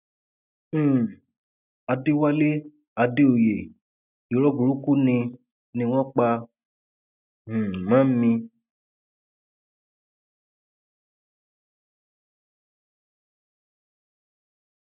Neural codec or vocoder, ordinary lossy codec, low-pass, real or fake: none; none; 3.6 kHz; real